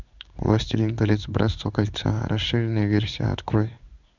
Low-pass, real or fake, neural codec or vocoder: 7.2 kHz; fake; codec, 16 kHz in and 24 kHz out, 1 kbps, XY-Tokenizer